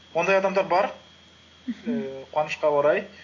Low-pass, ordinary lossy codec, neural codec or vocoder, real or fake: 7.2 kHz; AAC, 32 kbps; none; real